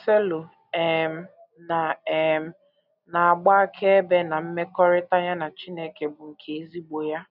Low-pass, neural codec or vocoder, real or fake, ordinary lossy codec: 5.4 kHz; none; real; none